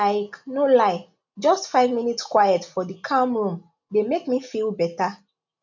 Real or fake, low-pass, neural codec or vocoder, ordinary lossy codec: real; 7.2 kHz; none; none